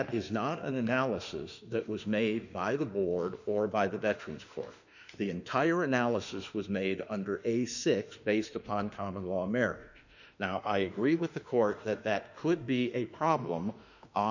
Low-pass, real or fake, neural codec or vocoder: 7.2 kHz; fake; autoencoder, 48 kHz, 32 numbers a frame, DAC-VAE, trained on Japanese speech